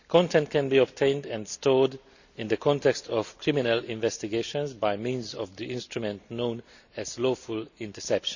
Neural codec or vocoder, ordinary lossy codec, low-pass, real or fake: none; none; 7.2 kHz; real